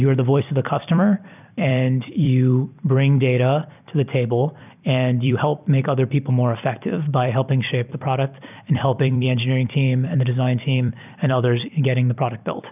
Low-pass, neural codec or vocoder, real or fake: 3.6 kHz; vocoder, 44.1 kHz, 128 mel bands every 256 samples, BigVGAN v2; fake